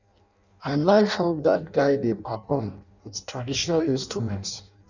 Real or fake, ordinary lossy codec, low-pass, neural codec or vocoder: fake; none; 7.2 kHz; codec, 16 kHz in and 24 kHz out, 0.6 kbps, FireRedTTS-2 codec